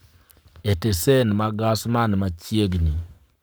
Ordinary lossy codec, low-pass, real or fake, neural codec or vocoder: none; none; fake; codec, 44.1 kHz, 7.8 kbps, Pupu-Codec